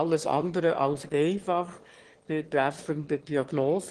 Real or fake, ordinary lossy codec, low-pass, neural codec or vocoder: fake; Opus, 16 kbps; 9.9 kHz; autoencoder, 22.05 kHz, a latent of 192 numbers a frame, VITS, trained on one speaker